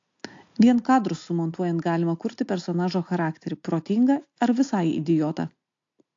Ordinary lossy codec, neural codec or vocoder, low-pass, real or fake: AAC, 48 kbps; none; 7.2 kHz; real